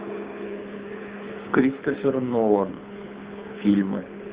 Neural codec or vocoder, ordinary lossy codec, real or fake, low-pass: codec, 24 kHz, 6 kbps, HILCodec; Opus, 32 kbps; fake; 3.6 kHz